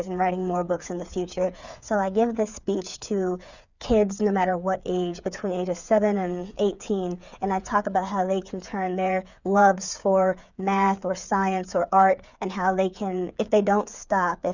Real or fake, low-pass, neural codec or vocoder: fake; 7.2 kHz; codec, 16 kHz, 8 kbps, FreqCodec, smaller model